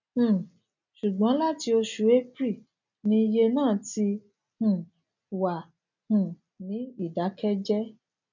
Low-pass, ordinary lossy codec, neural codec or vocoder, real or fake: 7.2 kHz; none; none; real